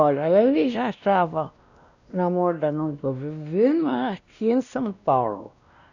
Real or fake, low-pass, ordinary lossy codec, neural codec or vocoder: fake; 7.2 kHz; none; codec, 16 kHz, 1 kbps, X-Codec, WavLM features, trained on Multilingual LibriSpeech